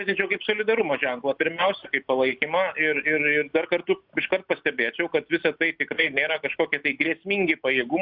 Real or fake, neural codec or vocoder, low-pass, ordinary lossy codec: real; none; 5.4 kHz; Opus, 64 kbps